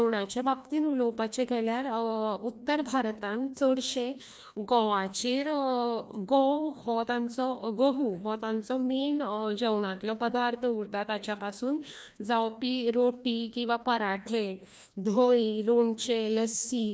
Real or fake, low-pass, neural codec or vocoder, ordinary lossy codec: fake; none; codec, 16 kHz, 1 kbps, FreqCodec, larger model; none